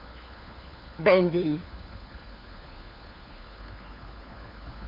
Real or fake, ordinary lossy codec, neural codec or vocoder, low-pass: fake; Opus, 64 kbps; codec, 16 kHz, 4 kbps, FunCodec, trained on LibriTTS, 50 frames a second; 5.4 kHz